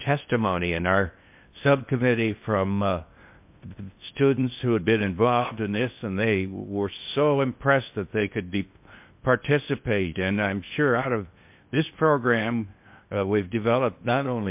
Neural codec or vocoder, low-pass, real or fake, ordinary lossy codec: codec, 16 kHz in and 24 kHz out, 0.6 kbps, FocalCodec, streaming, 4096 codes; 3.6 kHz; fake; MP3, 32 kbps